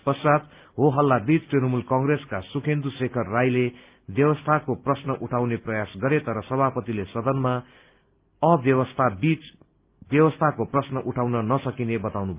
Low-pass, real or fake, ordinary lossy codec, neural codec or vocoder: 3.6 kHz; real; Opus, 32 kbps; none